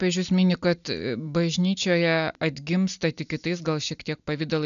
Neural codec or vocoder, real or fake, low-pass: none; real; 7.2 kHz